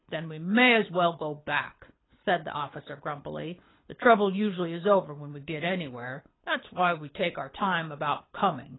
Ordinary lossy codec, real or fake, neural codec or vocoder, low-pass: AAC, 16 kbps; fake; codec, 24 kHz, 6 kbps, HILCodec; 7.2 kHz